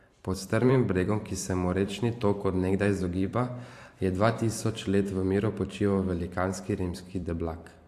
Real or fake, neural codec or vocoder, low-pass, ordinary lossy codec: fake; vocoder, 44.1 kHz, 128 mel bands every 512 samples, BigVGAN v2; 14.4 kHz; AAC, 64 kbps